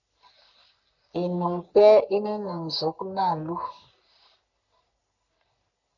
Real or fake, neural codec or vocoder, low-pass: fake; codec, 44.1 kHz, 3.4 kbps, Pupu-Codec; 7.2 kHz